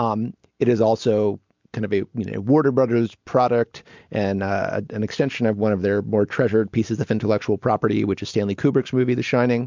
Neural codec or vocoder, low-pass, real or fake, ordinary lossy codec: none; 7.2 kHz; real; MP3, 64 kbps